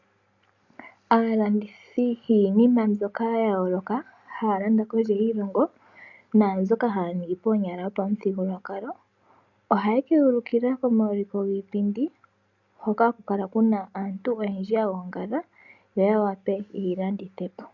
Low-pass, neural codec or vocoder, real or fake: 7.2 kHz; none; real